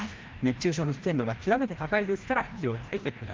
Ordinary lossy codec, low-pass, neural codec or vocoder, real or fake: Opus, 32 kbps; 7.2 kHz; codec, 16 kHz, 1 kbps, FreqCodec, larger model; fake